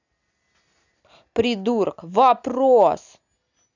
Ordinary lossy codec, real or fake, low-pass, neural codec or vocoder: none; real; 7.2 kHz; none